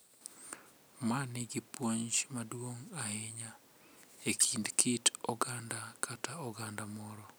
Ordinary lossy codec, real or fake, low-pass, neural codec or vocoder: none; real; none; none